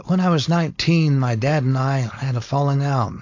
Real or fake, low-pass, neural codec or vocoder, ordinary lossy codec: fake; 7.2 kHz; codec, 16 kHz, 4.8 kbps, FACodec; AAC, 48 kbps